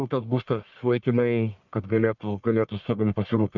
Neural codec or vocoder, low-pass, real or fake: codec, 44.1 kHz, 1.7 kbps, Pupu-Codec; 7.2 kHz; fake